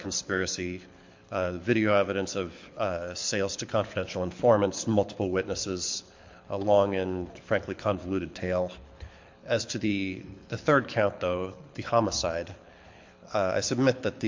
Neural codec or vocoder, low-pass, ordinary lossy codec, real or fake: codec, 24 kHz, 6 kbps, HILCodec; 7.2 kHz; MP3, 48 kbps; fake